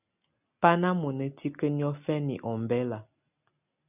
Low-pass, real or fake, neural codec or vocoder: 3.6 kHz; real; none